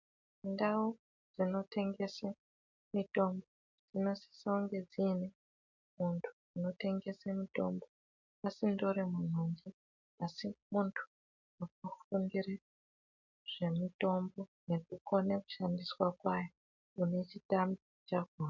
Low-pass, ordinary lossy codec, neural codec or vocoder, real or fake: 7.2 kHz; MP3, 64 kbps; none; real